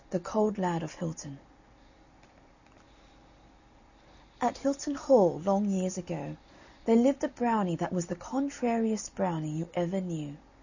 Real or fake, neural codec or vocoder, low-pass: real; none; 7.2 kHz